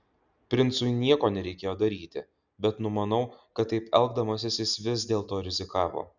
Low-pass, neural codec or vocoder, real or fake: 7.2 kHz; none; real